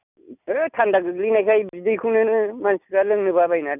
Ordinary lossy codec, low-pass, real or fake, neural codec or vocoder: none; 3.6 kHz; real; none